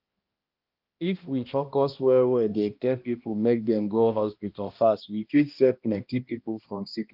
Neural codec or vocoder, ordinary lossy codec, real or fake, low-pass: codec, 16 kHz, 1 kbps, X-Codec, HuBERT features, trained on balanced general audio; Opus, 24 kbps; fake; 5.4 kHz